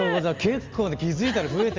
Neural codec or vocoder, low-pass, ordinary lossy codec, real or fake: none; 7.2 kHz; Opus, 32 kbps; real